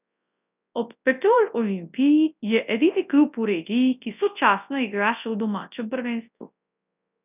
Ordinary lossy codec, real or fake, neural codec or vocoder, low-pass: none; fake; codec, 24 kHz, 0.9 kbps, WavTokenizer, large speech release; 3.6 kHz